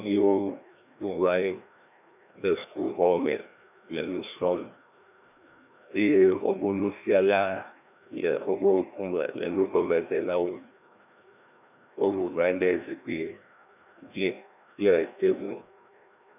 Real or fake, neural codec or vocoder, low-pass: fake; codec, 16 kHz, 1 kbps, FreqCodec, larger model; 3.6 kHz